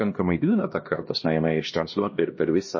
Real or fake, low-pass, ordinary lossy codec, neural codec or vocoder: fake; 7.2 kHz; MP3, 32 kbps; codec, 16 kHz, 1 kbps, X-Codec, HuBERT features, trained on LibriSpeech